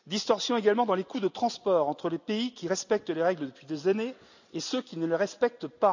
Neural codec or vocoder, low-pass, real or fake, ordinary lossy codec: none; 7.2 kHz; real; none